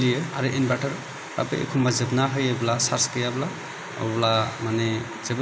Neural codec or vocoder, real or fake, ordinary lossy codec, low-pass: none; real; none; none